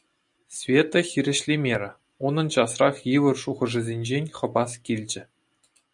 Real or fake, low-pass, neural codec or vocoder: real; 10.8 kHz; none